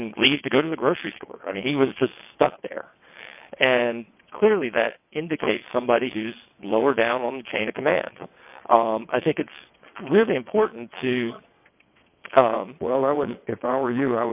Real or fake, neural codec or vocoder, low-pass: fake; vocoder, 22.05 kHz, 80 mel bands, WaveNeXt; 3.6 kHz